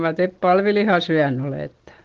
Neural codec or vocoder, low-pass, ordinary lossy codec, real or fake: none; 7.2 kHz; Opus, 16 kbps; real